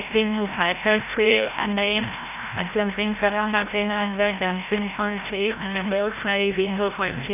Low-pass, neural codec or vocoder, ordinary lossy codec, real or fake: 3.6 kHz; codec, 16 kHz, 0.5 kbps, FreqCodec, larger model; none; fake